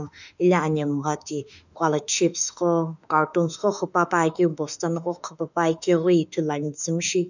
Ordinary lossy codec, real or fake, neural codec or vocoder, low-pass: none; fake; autoencoder, 48 kHz, 32 numbers a frame, DAC-VAE, trained on Japanese speech; 7.2 kHz